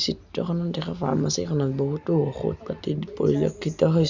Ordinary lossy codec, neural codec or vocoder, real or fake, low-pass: none; none; real; 7.2 kHz